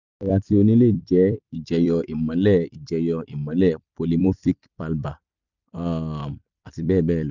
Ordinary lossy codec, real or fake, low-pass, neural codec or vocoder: none; fake; 7.2 kHz; vocoder, 44.1 kHz, 128 mel bands every 256 samples, BigVGAN v2